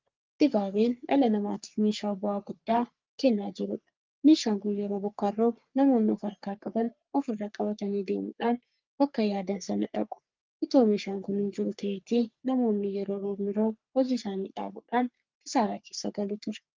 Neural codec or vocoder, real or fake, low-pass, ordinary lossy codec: codec, 44.1 kHz, 3.4 kbps, Pupu-Codec; fake; 7.2 kHz; Opus, 24 kbps